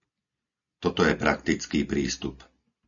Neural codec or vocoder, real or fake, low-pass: none; real; 7.2 kHz